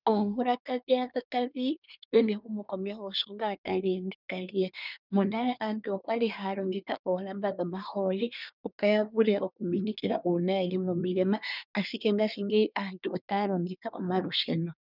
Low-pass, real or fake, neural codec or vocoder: 5.4 kHz; fake; codec, 24 kHz, 1 kbps, SNAC